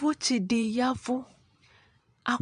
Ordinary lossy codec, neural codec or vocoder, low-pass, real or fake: MP3, 64 kbps; vocoder, 22.05 kHz, 80 mel bands, Vocos; 9.9 kHz; fake